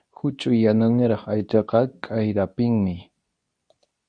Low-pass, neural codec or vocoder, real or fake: 9.9 kHz; codec, 24 kHz, 0.9 kbps, WavTokenizer, medium speech release version 2; fake